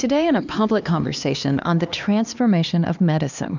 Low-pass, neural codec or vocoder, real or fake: 7.2 kHz; codec, 16 kHz, 2 kbps, X-Codec, HuBERT features, trained on LibriSpeech; fake